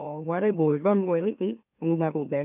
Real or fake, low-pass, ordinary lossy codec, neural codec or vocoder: fake; 3.6 kHz; none; autoencoder, 44.1 kHz, a latent of 192 numbers a frame, MeloTTS